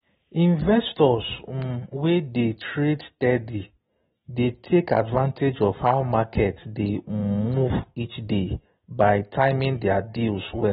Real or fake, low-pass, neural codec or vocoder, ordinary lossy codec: real; 19.8 kHz; none; AAC, 16 kbps